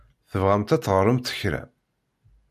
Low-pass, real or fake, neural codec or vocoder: 14.4 kHz; real; none